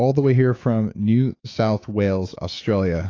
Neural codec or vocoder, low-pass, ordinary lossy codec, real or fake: autoencoder, 48 kHz, 128 numbers a frame, DAC-VAE, trained on Japanese speech; 7.2 kHz; AAC, 32 kbps; fake